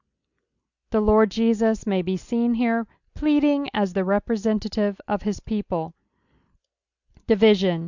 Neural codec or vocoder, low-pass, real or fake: none; 7.2 kHz; real